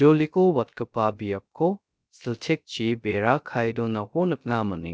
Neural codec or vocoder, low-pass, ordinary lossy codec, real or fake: codec, 16 kHz, 0.3 kbps, FocalCodec; none; none; fake